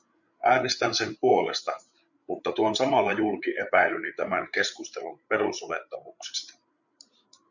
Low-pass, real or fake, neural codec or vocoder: 7.2 kHz; fake; codec, 16 kHz, 8 kbps, FreqCodec, larger model